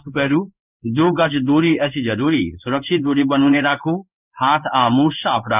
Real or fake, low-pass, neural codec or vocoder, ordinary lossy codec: fake; 3.6 kHz; codec, 16 kHz in and 24 kHz out, 1 kbps, XY-Tokenizer; none